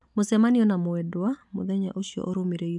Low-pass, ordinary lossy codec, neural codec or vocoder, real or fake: 10.8 kHz; none; none; real